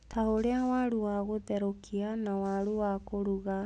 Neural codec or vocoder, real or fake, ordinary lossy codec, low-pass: none; real; none; none